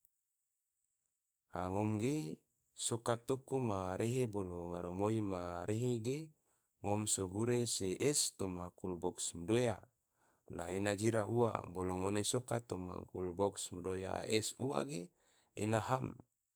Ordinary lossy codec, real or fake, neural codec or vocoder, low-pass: none; fake; codec, 44.1 kHz, 2.6 kbps, SNAC; none